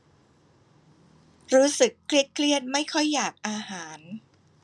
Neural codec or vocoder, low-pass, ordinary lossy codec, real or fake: none; none; none; real